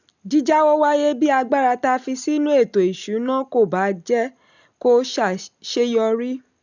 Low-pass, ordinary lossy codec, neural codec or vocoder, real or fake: 7.2 kHz; none; none; real